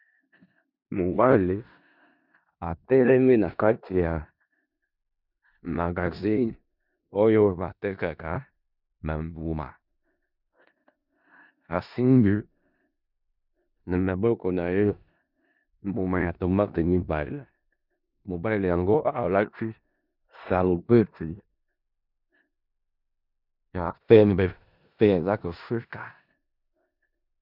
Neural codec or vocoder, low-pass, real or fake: codec, 16 kHz in and 24 kHz out, 0.4 kbps, LongCat-Audio-Codec, four codebook decoder; 5.4 kHz; fake